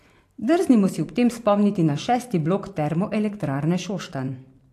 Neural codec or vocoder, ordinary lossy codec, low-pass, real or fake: vocoder, 44.1 kHz, 128 mel bands every 512 samples, BigVGAN v2; AAC, 64 kbps; 14.4 kHz; fake